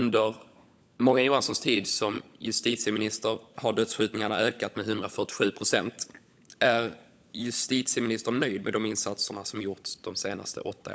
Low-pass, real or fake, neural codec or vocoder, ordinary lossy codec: none; fake; codec, 16 kHz, 16 kbps, FunCodec, trained on LibriTTS, 50 frames a second; none